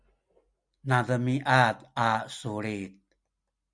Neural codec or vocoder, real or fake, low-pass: none; real; 9.9 kHz